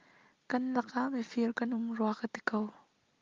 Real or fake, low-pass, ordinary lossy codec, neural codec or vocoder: real; 7.2 kHz; Opus, 32 kbps; none